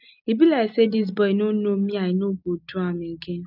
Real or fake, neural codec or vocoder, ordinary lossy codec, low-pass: real; none; none; 5.4 kHz